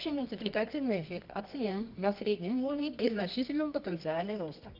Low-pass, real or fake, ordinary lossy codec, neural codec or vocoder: 5.4 kHz; fake; none; codec, 24 kHz, 0.9 kbps, WavTokenizer, medium music audio release